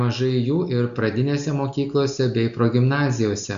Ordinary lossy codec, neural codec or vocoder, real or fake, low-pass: Opus, 64 kbps; none; real; 7.2 kHz